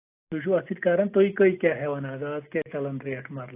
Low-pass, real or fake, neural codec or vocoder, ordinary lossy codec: 3.6 kHz; real; none; AAC, 24 kbps